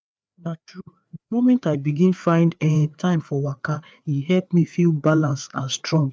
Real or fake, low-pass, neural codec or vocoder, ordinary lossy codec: fake; none; codec, 16 kHz, 4 kbps, FreqCodec, larger model; none